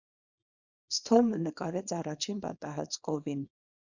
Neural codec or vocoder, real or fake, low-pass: codec, 24 kHz, 0.9 kbps, WavTokenizer, small release; fake; 7.2 kHz